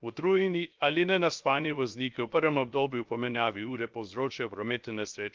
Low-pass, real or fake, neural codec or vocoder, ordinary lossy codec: 7.2 kHz; fake; codec, 16 kHz, 0.7 kbps, FocalCodec; Opus, 32 kbps